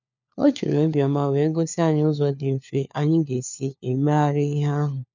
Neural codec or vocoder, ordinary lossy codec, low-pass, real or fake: codec, 16 kHz, 4 kbps, FunCodec, trained on LibriTTS, 50 frames a second; none; 7.2 kHz; fake